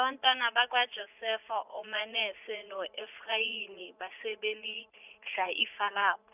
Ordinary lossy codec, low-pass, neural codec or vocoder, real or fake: none; 3.6 kHz; vocoder, 44.1 kHz, 80 mel bands, Vocos; fake